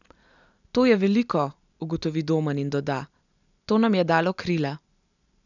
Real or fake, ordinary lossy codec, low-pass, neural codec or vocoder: real; none; 7.2 kHz; none